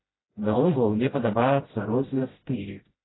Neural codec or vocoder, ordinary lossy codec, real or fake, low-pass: codec, 16 kHz, 0.5 kbps, FreqCodec, smaller model; AAC, 16 kbps; fake; 7.2 kHz